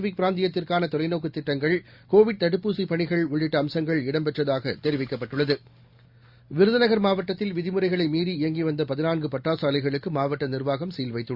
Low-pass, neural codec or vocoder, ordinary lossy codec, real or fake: 5.4 kHz; none; Opus, 64 kbps; real